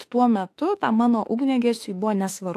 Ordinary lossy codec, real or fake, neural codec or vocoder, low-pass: AAC, 64 kbps; fake; autoencoder, 48 kHz, 32 numbers a frame, DAC-VAE, trained on Japanese speech; 14.4 kHz